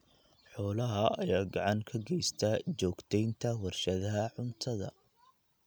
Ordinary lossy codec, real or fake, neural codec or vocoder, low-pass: none; real; none; none